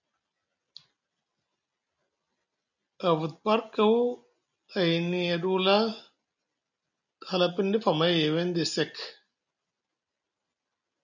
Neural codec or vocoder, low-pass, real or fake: none; 7.2 kHz; real